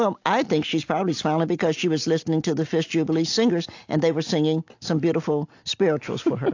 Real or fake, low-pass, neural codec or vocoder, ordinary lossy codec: real; 7.2 kHz; none; AAC, 48 kbps